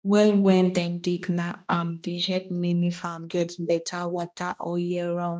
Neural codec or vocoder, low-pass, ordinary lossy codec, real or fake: codec, 16 kHz, 1 kbps, X-Codec, HuBERT features, trained on balanced general audio; none; none; fake